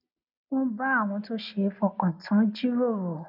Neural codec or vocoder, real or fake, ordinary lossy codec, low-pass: none; real; none; 5.4 kHz